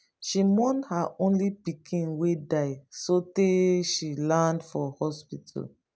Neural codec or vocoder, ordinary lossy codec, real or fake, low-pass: none; none; real; none